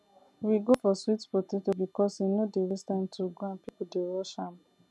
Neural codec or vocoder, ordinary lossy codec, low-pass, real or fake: none; none; none; real